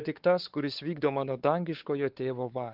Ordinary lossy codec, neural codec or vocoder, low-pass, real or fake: Opus, 16 kbps; codec, 16 kHz, 4 kbps, X-Codec, WavLM features, trained on Multilingual LibriSpeech; 5.4 kHz; fake